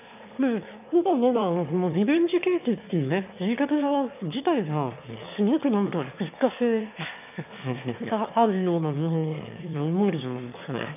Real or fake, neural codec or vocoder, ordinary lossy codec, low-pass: fake; autoencoder, 22.05 kHz, a latent of 192 numbers a frame, VITS, trained on one speaker; none; 3.6 kHz